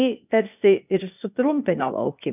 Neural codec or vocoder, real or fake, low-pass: codec, 16 kHz, 0.8 kbps, ZipCodec; fake; 3.6 kHz